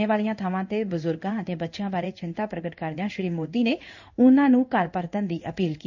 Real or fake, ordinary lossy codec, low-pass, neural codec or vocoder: fake; none; 7.2 kHz; codec, 16 kHz in and 24 kHz out, 1 kbps, XY-Tokenizer